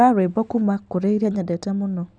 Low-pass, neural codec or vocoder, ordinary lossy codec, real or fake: 9.9 kHz; none; none; real